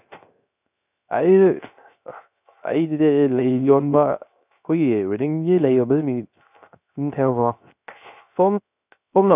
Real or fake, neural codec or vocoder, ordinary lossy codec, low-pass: fake; codec, 16 kHz, 0.3 kbps, FocalCodec; none; 3.6 kHz